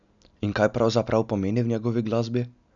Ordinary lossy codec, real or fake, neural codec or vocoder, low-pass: none; real; none; 7.2 kHz